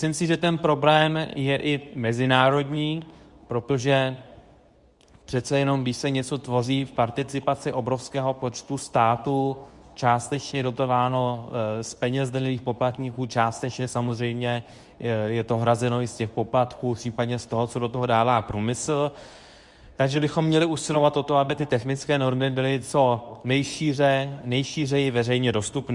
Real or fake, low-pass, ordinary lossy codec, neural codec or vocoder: fake; 10.8 kHz; Opus, 64 kbps; codec, 24 kHz, 0.9 kbps, WavTokenizer, medium speech release version 2